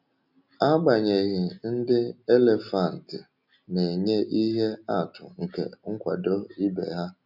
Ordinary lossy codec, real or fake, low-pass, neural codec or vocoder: none; real; 5.4 kHz; none